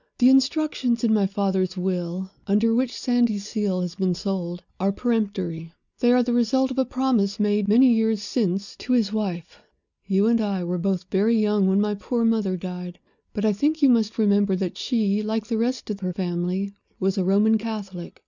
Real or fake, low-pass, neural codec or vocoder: real; 7.2 kHz; none